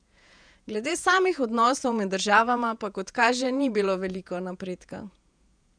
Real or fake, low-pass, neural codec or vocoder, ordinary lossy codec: fake; 9.9 kHz; vocoder, 48 kHz, 128 mel bands, Vocos; none